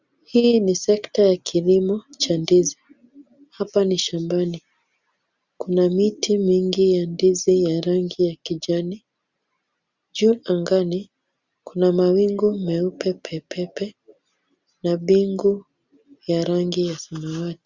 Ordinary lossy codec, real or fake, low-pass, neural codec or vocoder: Opus, 64 kbps; real; 7.2 kHz; none